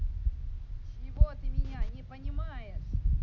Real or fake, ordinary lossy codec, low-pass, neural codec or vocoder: real; none; 7.2 kHz; none